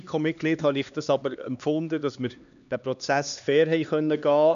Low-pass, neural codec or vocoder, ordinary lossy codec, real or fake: 7.2 kHz; codec, 16 kHz, 2 kbps, X-Codec, HuBERT features, trained on LibriSpeech; none; fake